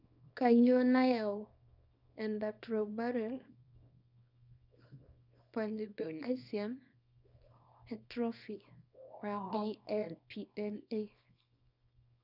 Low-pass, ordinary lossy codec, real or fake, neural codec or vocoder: 5.4 kHz; none; fake; codec, 24 kHz, 0.9 kbps, WavTokenizer, small release